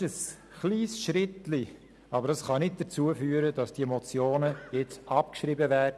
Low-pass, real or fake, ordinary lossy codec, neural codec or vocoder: none; real; none; none